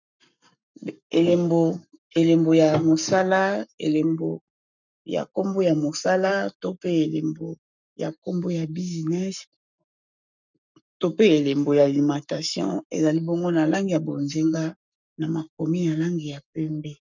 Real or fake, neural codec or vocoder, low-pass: fake; codec, 44.1 kHz, 7.8 kbps, Pupu-Codec; 7.2 kHz